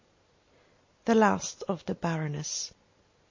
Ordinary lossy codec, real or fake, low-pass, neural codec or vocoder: MP3, 32 kbps; real; 7.2 kHz; none